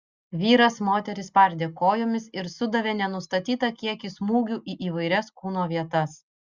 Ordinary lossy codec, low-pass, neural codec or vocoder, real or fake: Opus, 64 kbps; 7.2 kHz; none; real